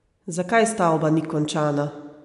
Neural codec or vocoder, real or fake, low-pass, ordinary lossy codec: none; real; 10.8 kHz; MP3, 64 kbps